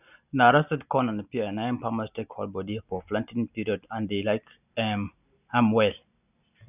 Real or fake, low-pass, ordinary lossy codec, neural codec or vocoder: real; 3.6 kHz; none; none